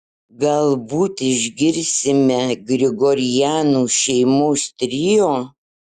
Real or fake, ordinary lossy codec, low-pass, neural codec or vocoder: real; Opus, 64 kbps; 14.4 kHz; none